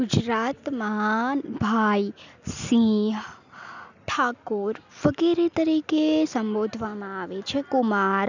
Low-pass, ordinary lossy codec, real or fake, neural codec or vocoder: 7.2 kHz; none; real; none